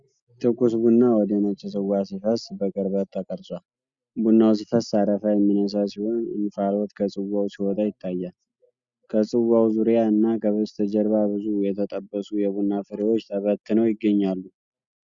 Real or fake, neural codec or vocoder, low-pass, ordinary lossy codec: real; none; 7.2 kHz; Opus, 64 kbps